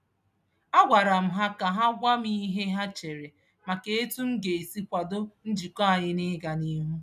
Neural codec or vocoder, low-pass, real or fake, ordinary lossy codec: none; 14.4 kHz; real; none